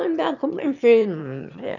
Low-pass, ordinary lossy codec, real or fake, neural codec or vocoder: 7.2 kHz; none; fake; autoencoder, 22.05 kHz, a latent of 192 numbers a frame, VITS, trained on one speaker